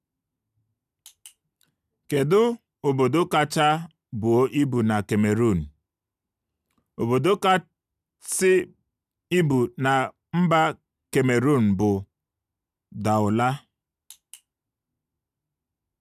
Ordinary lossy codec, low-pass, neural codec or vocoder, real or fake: none; 14.4 kHz; none; real